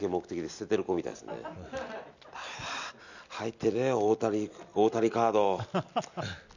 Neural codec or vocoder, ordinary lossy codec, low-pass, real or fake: none; none; 7.2 kHz; real